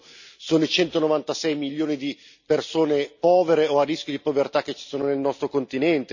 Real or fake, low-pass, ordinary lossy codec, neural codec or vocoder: real; 7.2 kHz; none; none